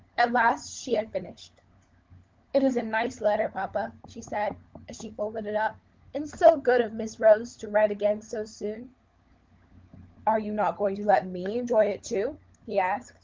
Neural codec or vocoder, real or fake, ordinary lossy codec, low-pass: codec, 16 kHz, 16 kbps, FunCodec, trained on LibriTTS, 50 frames a second; fake; Opus, 32 kbps; 7.2 kHz